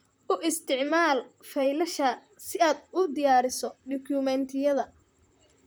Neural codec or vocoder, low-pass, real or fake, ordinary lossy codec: vocoder, 44.1 kHz, 128 mel bands, Pupu-Vocoder; none; fake; none